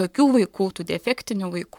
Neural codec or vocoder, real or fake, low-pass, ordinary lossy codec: codec, 44.1 kHz, 7.8 kbps, Pupu-Codec; fake; 19.8 kHz; MP3, 96 kbps